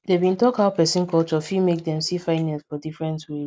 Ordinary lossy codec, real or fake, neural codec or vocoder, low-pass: none; real; none; none